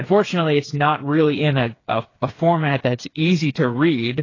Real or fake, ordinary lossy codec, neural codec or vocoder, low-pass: fake; AAC, 32 kbps; codec, 16 kHz, 4 kbps, FreqCodec, smaller model; 7.2 kHz